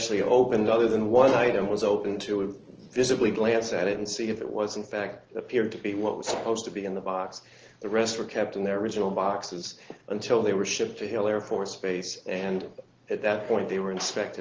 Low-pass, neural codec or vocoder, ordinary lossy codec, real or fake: 7.2 kHz; none; Opus, 16 kbps; real